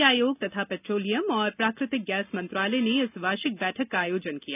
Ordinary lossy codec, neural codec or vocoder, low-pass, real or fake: none; none; 3.6 kHz; real